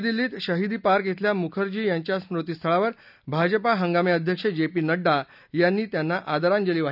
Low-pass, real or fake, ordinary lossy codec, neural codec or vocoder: 5.4 kHz; real; none; none